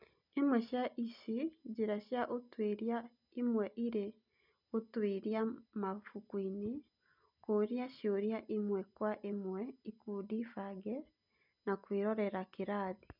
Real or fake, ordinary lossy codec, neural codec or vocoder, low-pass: real; none; none; 5.4 kHz